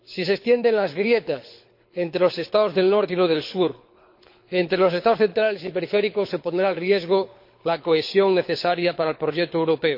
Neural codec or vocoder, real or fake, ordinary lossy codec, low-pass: codec, 24 kHz, 6 kbps, HILCodec; fake; MP3, 32 kbps; 5.4 kHz